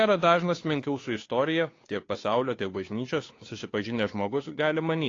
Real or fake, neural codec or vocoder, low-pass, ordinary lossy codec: fake; codec, 16 kHz, 2 kbps, FunCodec, trained on Chinese and English, 25 frames a second; 7.2 kHz; AAC, 32 kbps